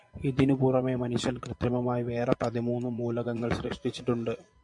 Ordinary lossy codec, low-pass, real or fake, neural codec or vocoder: MP3, 64 kbps; 10.8 kHz; fake; vocoder, 24 kHz, 100 mel bands, Vocos